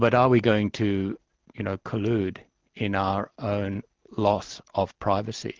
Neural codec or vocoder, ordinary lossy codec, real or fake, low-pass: none; Opus, 16 kbps; real; 7.2 kHz